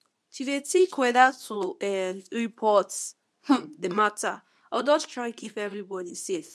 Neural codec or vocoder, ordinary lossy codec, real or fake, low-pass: codec, 24 kHz, 0.9 kbps, WavTokenizer, medium speech release version 2; none; fake; none